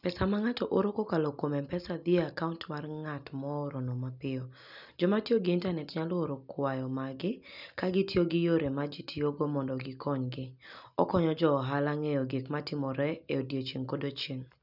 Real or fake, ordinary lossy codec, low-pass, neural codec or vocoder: real; none; 5.4 kHz; none